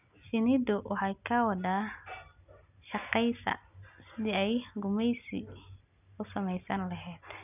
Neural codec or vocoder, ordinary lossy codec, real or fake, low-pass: none; none; real; 3.6 kHz